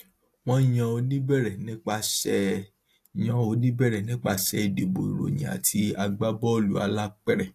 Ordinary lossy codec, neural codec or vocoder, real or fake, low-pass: AAC, 64 kbps; none; real; 14.4 kHz